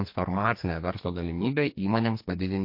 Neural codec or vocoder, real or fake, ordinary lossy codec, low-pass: codec, 44.1 kHz, 2.6 kbps, SNAC; fake; MP3, 32 kbps; 5.4 kHz